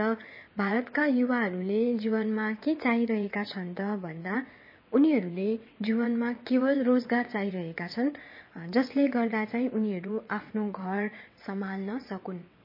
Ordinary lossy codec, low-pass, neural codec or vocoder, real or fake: MP3, 24 kbps; 5.4 kHz; vocoder, 22.05 kHz, 80 mel bands, WaveNeXt; fake